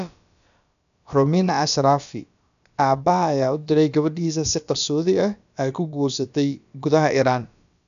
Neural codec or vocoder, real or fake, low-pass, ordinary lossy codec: codec, 16 kHz, about 1 kbps, DyCAST, with the encoder's durations; fake; 7.2 kHz; none